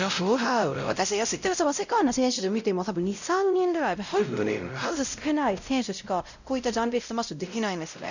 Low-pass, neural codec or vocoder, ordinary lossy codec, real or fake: 7.2 kHz; codec, 16 kHz, 0.5 kbps, X-Codec, WavLM features, trained on Multilingual LibriSpeech; none; fake